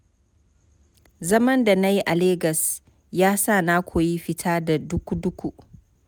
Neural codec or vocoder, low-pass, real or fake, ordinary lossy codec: none; none; real; none